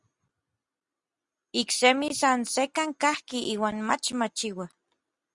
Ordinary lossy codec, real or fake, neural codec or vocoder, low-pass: Opus, 64 kbps; real; none; 10.8 kHz